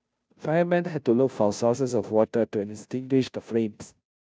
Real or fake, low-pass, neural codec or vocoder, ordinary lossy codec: fake; none; codec, 16 kHz, 0.5 kbps, FunCodec, trained on Chinese and English, 25 frames a second; none